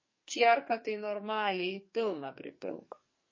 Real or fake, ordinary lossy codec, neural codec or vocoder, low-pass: fake; MP3, 32 kbps; codec, 44.1 kHz, 2.6 kbps, SNAC; 7.2 kHz